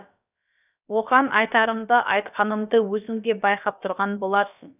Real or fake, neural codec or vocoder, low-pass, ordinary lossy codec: fake; codec, 16 kHz, about 1 kbps, DyCAST, with the encoder's durations; 3.6 kHz; none